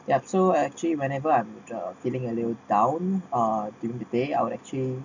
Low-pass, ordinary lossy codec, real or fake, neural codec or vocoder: 7.2 kHz; none; real; none